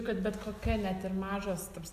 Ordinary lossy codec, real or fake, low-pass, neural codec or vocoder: AAC, 96 kbps; real; 14.4 kHz; none